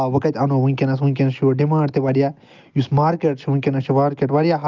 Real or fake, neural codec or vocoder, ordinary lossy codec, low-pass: real; none; Opus, 24 kbps; 7.2 kHz